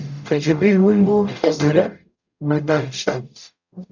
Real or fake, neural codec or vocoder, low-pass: fake; codec, 44.1 kHz, 0.9 kbps, DAC; 7.2 kHz